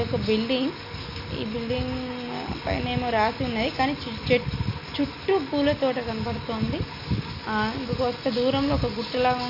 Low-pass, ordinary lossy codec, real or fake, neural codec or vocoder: 5.4 kHz; AAC, 32 kbps; real; none